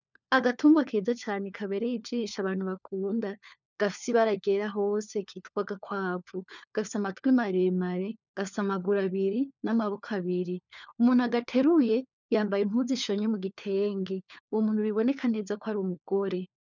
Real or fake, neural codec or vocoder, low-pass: fake; codec, 16 kHz, 4 kbps, FunCodec, trained on LibriTTS, 50 frames a second; 7.2 kHz